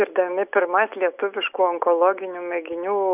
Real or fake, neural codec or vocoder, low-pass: real; none; 3.6 kHz